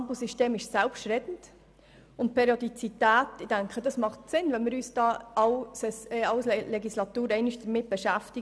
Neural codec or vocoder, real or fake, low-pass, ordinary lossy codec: none; real; none; none